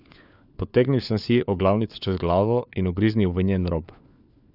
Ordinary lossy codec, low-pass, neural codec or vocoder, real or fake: none; 5.4 kHz; codec, 16 kHz, 4 kbps, FunCodec, trained on LibriTTS, 50 frames a second; fake